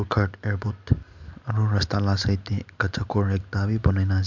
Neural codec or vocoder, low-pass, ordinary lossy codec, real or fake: none; 7.2 kHz; MP3, 64 kbps; real